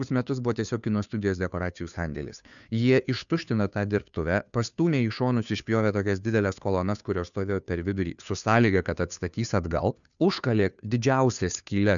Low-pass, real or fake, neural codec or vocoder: 7.2 kHz; fake; codec, 16 kHz, 2 kbps, FunCodec, trained on Chinese and English, 25 frames a second